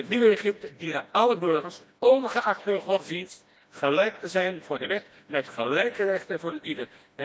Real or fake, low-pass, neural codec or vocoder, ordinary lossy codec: fake; none; codec, 16 kHz, 1 kbps, FreqCodec, smaller model; none